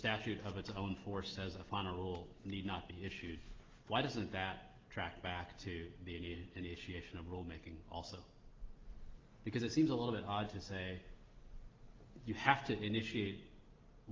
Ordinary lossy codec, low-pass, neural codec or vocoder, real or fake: Opus, 32 kbps; 7.2 kHz; none; real